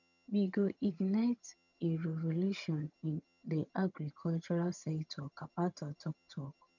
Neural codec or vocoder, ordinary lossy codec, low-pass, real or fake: vocoder, 22.05 kHz, 80 mel bands, HiFi-GAN; none; 7.2 kHz; fake